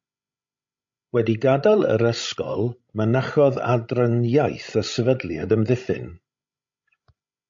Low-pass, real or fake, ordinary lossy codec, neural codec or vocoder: 7.2 kHz; fake; MP3, 48 kbps; codec, 16 kHz, 16 kbps, FreqCodec, larger model